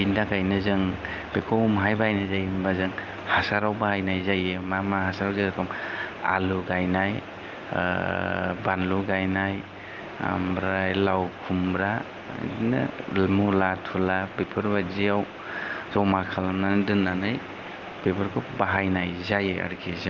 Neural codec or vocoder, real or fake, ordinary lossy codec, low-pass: none; real; Opus, 32 kbps; 7.2 kHz